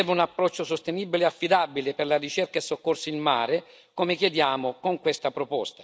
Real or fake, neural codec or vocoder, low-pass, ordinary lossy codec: real; none; none; none